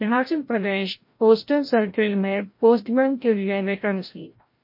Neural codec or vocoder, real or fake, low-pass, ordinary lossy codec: codec, 16 kHz, 0.5 kbps, FreqCodec, larger model; fake; 5.4 kHz; MP3, 32 kbps